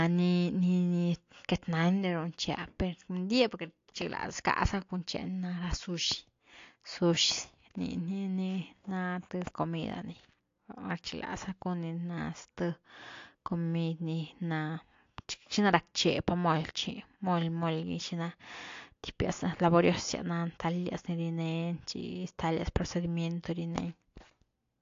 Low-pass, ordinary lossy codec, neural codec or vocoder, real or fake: 7.2 kHz; AAC, 48 kbps; none; real